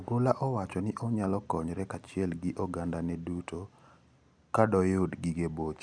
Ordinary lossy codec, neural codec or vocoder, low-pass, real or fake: none; none; 9.9 kHz; real